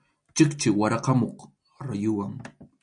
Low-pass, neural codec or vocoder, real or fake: 9.9 kHz; none; real